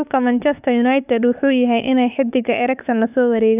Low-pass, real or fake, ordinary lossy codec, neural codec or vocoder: 3.6 kHz; fake; none; autoencoder, 48 kHz, 32 numbers a frame, DAC-VAE, trained on Japanese speech